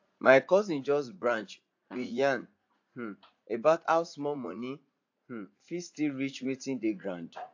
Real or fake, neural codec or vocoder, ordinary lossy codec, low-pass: fake; vocoder, 44.1 kHz, 80 mel bands, Vocos; AAC, 48 kbps; 7.2 kHz